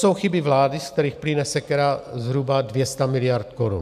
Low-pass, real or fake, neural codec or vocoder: 14.4 kHz; real; none